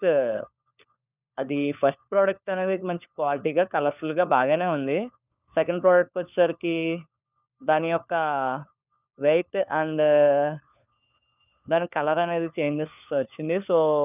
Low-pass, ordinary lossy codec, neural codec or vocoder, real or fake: 3.6 kHz; none; codec, 16 kHz, 4 kbps, FunCodec, trained on LibriTTS, 50 frames a second; fake